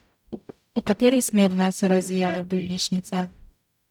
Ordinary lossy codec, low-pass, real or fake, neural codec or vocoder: none; 19.8 kHz; fake; codec, 44.1 kHz, 0.9 kbps, DAC